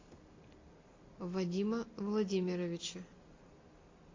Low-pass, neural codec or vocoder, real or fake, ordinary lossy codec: 7.2 kHz; none; real; AAC, 32 kbps